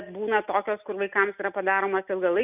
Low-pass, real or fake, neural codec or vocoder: 3.6 kHz; real; none